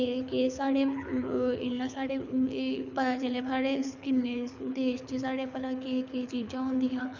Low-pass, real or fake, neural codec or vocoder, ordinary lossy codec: 7.2 kHz; fake; codec, 24 kHz, 6 kbps, HILCodec; none